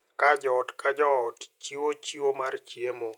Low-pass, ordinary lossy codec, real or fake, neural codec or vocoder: 19.8 kHz; none; fake; vocoder, 48 kHz, 128 mel bands, Vocos